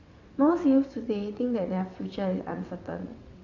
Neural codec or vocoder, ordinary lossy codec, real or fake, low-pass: vocoder, 22.05 kHz, 80 mel bands, WaveNeXt; AAC, 48 kbps; fake; 7.2 kHz